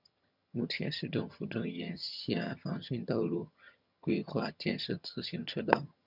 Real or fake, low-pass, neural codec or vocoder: fake; 5.4 kHz; vocoder, 22.05 kHz, 80 mel bands, HiFi-GAN